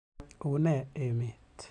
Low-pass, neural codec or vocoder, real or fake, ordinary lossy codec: 10.8 kHz; none; real; none